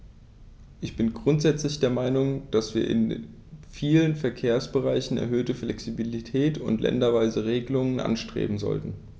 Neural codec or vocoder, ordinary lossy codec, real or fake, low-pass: none; none; real; none